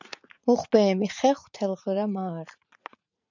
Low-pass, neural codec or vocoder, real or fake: 7.2 kHz; vocoder, 44.1 kHz, 80 mel bands, Vocos; fake